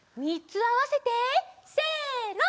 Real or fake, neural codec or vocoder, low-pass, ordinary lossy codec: real; none; none; none